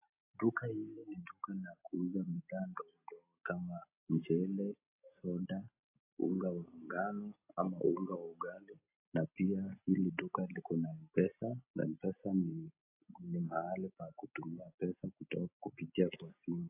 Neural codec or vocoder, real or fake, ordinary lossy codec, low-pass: none; real; AAC, 32 kbps; 3.6 kHz